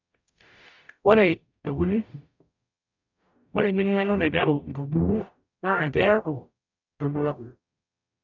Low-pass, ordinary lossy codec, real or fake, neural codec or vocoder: 7.2 kHz; none; fake; codec, 44.1 kHz, 0.9 kbps, DAC